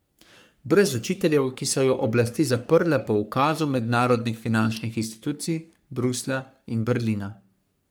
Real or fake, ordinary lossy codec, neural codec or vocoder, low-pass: fake; none; codec, 44.1 kHz, 3.4 kbps, Pupu-Codec; none